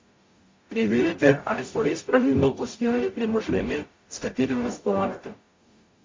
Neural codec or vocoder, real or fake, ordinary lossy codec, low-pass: codec, 44.1 kHz, 0.9 kbps, DAC; fake; MP3, 48 kbps; 7.2 kHz